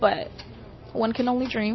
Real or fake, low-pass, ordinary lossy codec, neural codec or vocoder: real; 7.2 kHz; MP3, 24 kbps; none